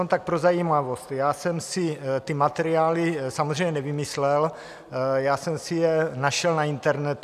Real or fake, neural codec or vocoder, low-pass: real; none; 14.4 kHz